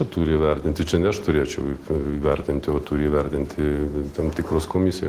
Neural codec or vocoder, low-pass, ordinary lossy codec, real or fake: none; 14.4 kHz; Opus, 16 kbps; real